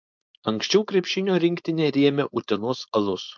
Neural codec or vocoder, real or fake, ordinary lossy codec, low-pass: codec, 16 kHz, 4.8 kbps, FACodec; fake; MP3, 64 kbps; 7.2 kHz